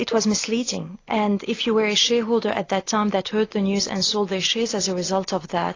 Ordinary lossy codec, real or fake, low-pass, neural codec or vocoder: AAC, 32 kbps; real; 7.2 kHz; none